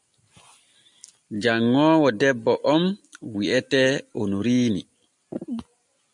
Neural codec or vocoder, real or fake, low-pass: none; real; 10.8 kHz